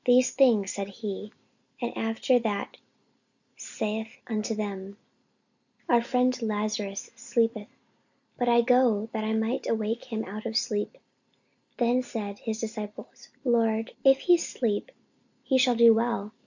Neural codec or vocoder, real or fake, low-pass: none; real; 7.2 kHz